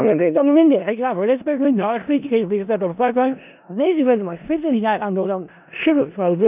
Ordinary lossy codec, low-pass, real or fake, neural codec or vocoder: none; 3.6 kHz; fake; codec, 16 kHz in and 24 kHz out, 0.4 kbps, LongCat-Audio-Codec, four codebook decoder